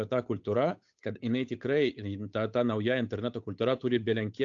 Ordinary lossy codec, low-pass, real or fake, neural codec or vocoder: AAC, 64 kbps; 7.2 kHz; fake; codec, 16 kHz, 8 kbps, FunCodec, trained on Chinese and English, 25 frames a second